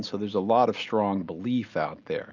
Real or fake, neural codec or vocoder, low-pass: real; none; 7.2 kHz